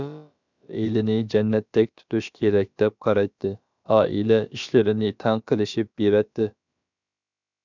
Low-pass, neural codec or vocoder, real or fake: 7.2 kHz; codec, 16 kHz, about 1 kbps, DyCAST, with the encoder's durations; fake